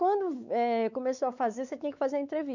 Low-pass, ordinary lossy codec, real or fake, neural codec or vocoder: 7.2 kHz; none; fake; codec, 16 kHz, 4 kbps, X-Codec, WavLM features, trained on Multilingual LibriSpeech